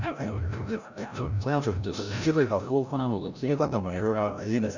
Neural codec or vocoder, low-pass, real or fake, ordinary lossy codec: codec, 16 kHz, 0.5 kbps, FreqCodec, larger model; 7.2 kHz; fake; none